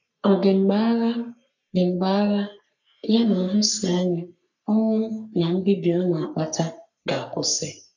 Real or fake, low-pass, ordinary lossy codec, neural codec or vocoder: fake; 7.2 kHz; none; codec, 44.1 kHz, 3.4 kbps, Pupu-Codec